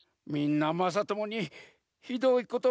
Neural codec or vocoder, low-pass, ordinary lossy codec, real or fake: none; none; none; real